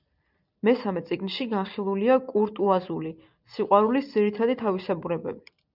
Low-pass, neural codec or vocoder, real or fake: 5.4 kHz; none; real